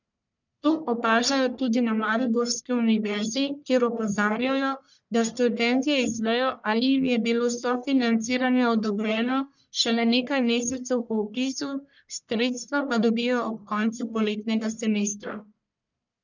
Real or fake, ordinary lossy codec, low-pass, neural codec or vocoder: fake; none; 7.2 kHz; codec, 44.1 kHz, 1.7 kbps, Pupu-Codec